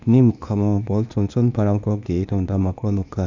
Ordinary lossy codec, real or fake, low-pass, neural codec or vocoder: none; fake; 7.2 kHz; codec, 16 kHz, 0.8 kbps, ZipCodec